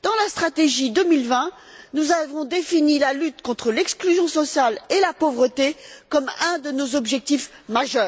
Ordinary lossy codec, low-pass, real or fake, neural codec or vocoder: none; none; real; none